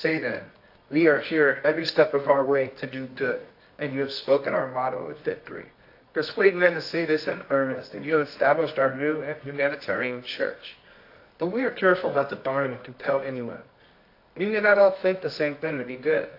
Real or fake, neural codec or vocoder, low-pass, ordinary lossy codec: fake; codec, 24 kHz, 0.9 kbps, WavTokenizer, medium music audio release; 5.4 kHz; AAC, 32 kbps